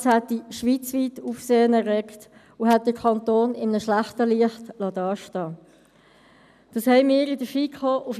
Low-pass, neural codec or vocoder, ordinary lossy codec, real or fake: 14.4 kHz; none; none; real